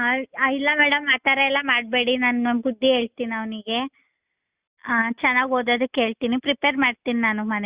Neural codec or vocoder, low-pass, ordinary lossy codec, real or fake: none; 3.6 kHz; Opus, 64 kbps; real